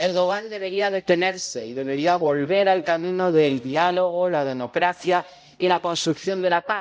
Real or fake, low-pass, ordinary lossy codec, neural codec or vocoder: fake; none; none; codec, 16 kHz, 0.5 kbps, X-Codec, HuBERT features, trained on balanced general audio